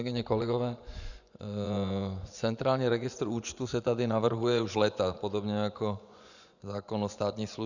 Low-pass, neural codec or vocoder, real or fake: 7.2 kHz; vocoder, 24 kHz, 100 mel bands, Vocos; fake